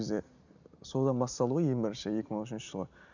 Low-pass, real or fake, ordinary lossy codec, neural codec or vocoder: 7.2 kHz; real; none; none